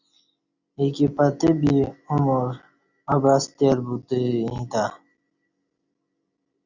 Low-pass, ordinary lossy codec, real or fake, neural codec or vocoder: 7.2 kHz; Opus, 64 kbps; real; none